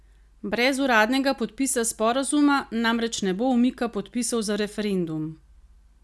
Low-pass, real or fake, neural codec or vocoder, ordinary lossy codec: none; real; none; none